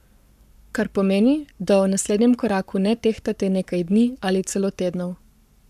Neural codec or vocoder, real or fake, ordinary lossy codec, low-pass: codec, 44.1 kHz, 7.8 kbps, Pupu-Codec; fake; none; 14.4 kHz